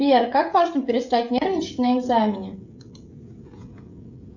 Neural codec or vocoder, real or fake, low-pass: codec, 16 kHz, 16 kbps, FreqCodec, smaller model; fake; 7.2 kHz